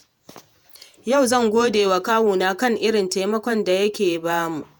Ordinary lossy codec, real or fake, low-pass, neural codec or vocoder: none; fake; none; vocoder, 48 kHz, 128 mel bands, Vocos